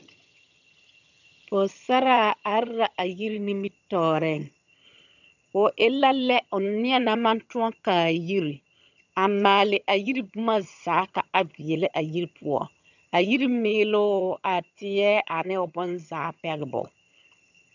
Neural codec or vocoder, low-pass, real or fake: vocoder, 22.05 kHz, 80 mel bands, HiFi-GAN; 7.2 kHz; fake